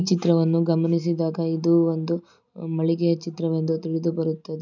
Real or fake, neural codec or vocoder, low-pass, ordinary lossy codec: real; none; 7.2 kHz; none